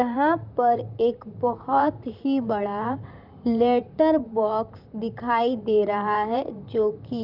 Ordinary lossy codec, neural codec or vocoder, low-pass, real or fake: none; vocoder, 44.1 kHz, 128 mel bands, Pupu-Vocoder; 5.4 kHz; fake